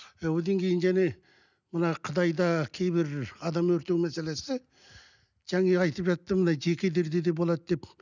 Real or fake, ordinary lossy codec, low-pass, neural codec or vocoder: real; none; 7.2 kHz; none